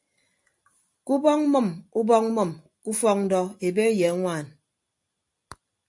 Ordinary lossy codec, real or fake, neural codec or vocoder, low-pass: AAC, 64 kbps; real; none; 10.8 kHz